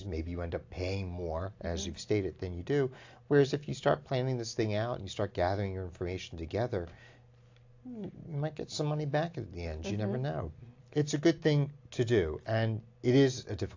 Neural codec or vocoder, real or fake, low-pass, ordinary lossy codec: none; real; 7.2 kHz; AAC, 48 kbps